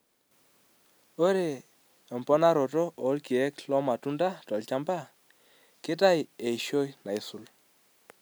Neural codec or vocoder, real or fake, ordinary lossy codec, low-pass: none; real; none; none